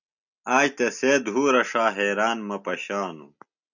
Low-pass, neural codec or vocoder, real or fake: 7.2 kHz; none; real